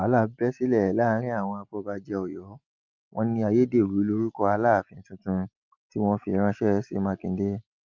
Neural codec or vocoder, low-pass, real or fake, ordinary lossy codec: none; 7.2 kHz; real; Opus, 24 kbps